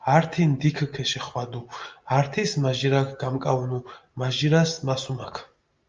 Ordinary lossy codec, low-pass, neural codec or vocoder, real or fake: Opus, 24 kbps; 7.2 kHz; none; real